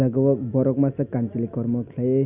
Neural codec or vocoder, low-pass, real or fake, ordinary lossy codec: none; 3.6 kHz; real; Opus, 64 kbps